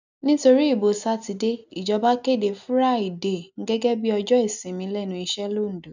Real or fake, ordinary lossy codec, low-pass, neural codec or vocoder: real; none; 7.2 kHz; none